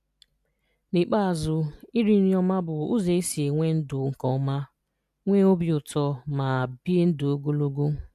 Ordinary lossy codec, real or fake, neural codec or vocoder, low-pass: none; real; none; 14.4 kHz